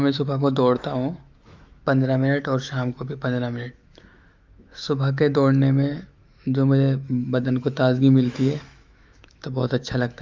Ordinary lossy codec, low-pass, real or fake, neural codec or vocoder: Opus, 24 kbps; 7.2 kHz; fake; autoencoder, 48 kHz, 128 numbers a frame, DAC-VAE, trained on Japanese speech